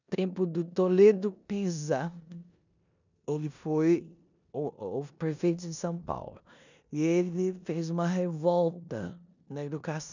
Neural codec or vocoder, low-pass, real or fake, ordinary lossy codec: codec, 16 kHz in and 24 kHz out, 0.9 kbps, LongCat-Audio-Codec, four codebook decoder; 7.2 kHz; fake; none